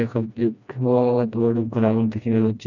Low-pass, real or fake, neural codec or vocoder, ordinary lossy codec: 7.2 kHz; fake; codec, 16 kHz, 1 kbps, FreqCodec, smaller model; none